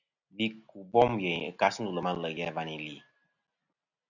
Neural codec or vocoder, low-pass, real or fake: none; 7.2 kHz; real